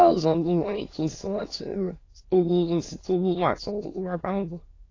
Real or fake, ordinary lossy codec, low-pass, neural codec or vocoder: fake; AAC, 32 kbps; 7.2 kHz; autoencoder, 22.05 kHz, a latent of 192 numbers a frame, VITS, trained on many speakers